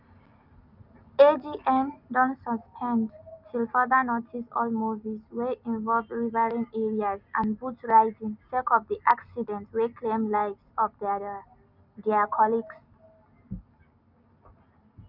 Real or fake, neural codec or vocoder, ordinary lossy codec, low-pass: real; none; none; 5.4 kHz